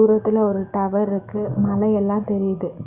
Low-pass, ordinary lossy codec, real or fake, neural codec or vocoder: 3.6 kHz; Opus, 64 kbps; fake; codec, 44.1 kHz, 7.8 kbps, DAC